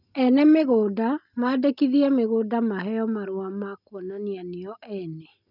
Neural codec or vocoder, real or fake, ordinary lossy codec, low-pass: none; real; none; 5.4 kHz